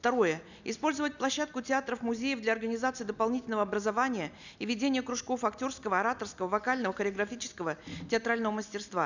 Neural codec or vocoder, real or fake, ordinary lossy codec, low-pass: none; real; none; 7.2 kHz